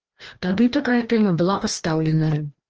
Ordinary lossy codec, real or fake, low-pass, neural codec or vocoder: Opus, 16 kbps; fake; 7.2 kHz; codec, 16 kHz, 1 kbps, FreqCodec, larger model